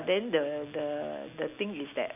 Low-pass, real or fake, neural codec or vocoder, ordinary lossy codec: 3.6 kHz; real; none; none